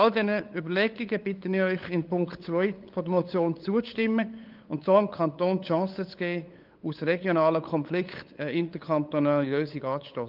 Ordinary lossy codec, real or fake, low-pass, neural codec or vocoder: Opus, 24 kbps; fake; 5.4 kHz; codec, 16 kHz, 8 kbps, FunCodec, trained on LibriTTS, 25 frames a second